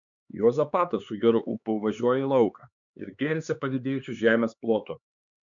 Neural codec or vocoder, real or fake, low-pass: codec, 16 kHz, 4 kbps, X-Codec, HuBERT features, trained on LibriSpeech; fake; 7.2 kHz